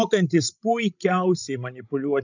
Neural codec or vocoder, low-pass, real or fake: codec, 16 kHz, 16 kbps, FreqCodec, larger model; 7.2 kHz; fake